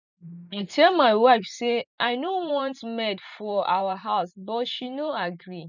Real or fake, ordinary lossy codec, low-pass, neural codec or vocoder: fake; none; 7.2 kHz; vocoder, 44.1 kHz, 128 mel bands every 512 samples, BigVGAN v2